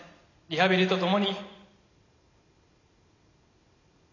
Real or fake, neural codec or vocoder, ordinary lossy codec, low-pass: real; none; none; 7.2 kHz